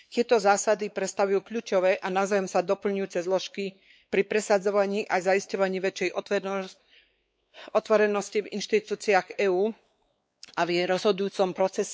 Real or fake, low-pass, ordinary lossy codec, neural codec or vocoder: fake; none; none; codec, 16 kHz, 4 kbps, X-Codec, WavLM features, trained on Multilingual LibriSpeech